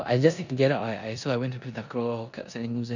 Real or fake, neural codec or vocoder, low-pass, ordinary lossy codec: fake; codec, 16 kHz in and 24 kHz out, 0.9 kbps, LongCat-Audio-Codec, four codebook decoder; 7.2 kHz; none